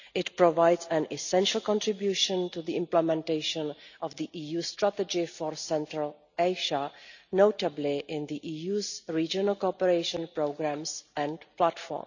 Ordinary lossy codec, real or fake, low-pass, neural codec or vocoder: none; real; 7.2 kHz; none